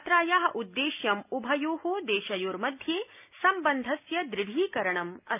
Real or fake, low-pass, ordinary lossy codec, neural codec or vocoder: real; 3.6 kHz; none; none